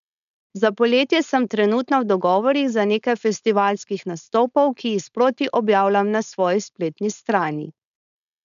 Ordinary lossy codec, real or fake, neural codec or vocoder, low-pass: none; fake; codec, 16 kHz, 4.8 kbps, FACodec; 7.2 kHz